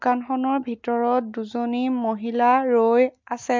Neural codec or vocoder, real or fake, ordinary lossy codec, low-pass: none; real; MP3, 48 kbps; 7.2 kHz